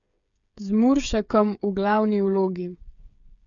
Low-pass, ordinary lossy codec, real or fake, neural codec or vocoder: 7.2 kHz; none; fake; codec, 16 kHz, 8 kbps, FreqCodec, smaller model